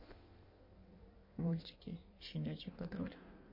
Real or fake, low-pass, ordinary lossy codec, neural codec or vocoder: fake; 5.4 kHz; MP3, 32 kbps; codec, 16 kHz in and 24 kHz out, 1.1 kbps, FireRedTTS-2 codec